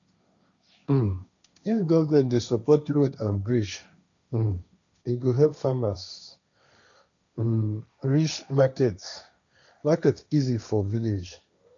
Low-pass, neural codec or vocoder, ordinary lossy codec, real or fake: 7.2 kHz; codec, 16 kHz, 1.1 kbps, Voila-Tokenizer; none; fake